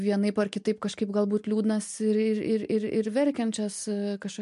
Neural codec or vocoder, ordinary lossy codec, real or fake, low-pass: none; MP3, 64 kbps; real; 10.8 kHz